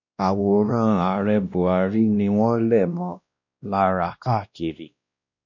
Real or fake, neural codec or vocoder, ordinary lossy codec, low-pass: fake; codec, 16 kHz, 1 kbps, X-Codec, WavLM features, trained on Multilingual LibriSpeech; none; 7.2 kHz